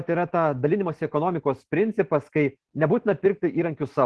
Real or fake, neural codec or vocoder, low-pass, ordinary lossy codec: real; none; 10.8 kHz; Opus, 16 kbps